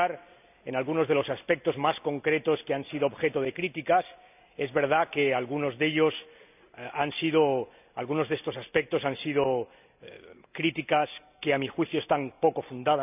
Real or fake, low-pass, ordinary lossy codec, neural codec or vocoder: real; 3.6 kHz; none; none